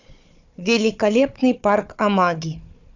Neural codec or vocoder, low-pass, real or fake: codec, 16 kHz, 4 kbps, FunCodec, trained on Chinese and English, 50 frames a second; 7.2 kHz; fake